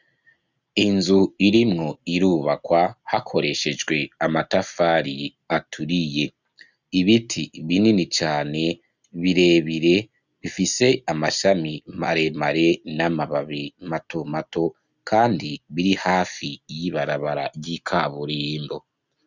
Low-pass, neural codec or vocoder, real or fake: 7.2 kHz; none; real